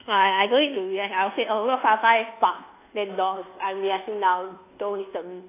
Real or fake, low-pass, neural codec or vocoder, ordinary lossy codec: fake; 3.6 kHz; codec, 24 kHz, 1.2 kbps, DualCodec; AAC, 24 kbps